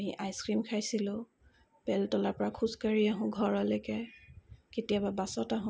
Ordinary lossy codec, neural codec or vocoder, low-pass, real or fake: none; none; none; real